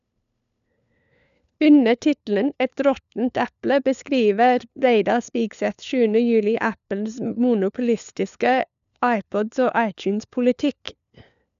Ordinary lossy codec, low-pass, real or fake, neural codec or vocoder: none; 7.2 kHz; fake; codec, 16 kHz, 4 kbps, FunCodec, trained on LibriTTS, 50 frames a second